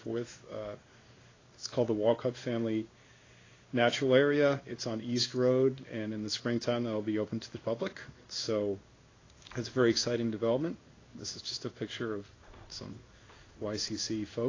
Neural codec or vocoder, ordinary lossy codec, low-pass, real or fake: codec, 16 kHz in and 24 kHz out, 1 kbps, XY-Tokenizer; AAC, 32 kbps; 7.2 kHz; fake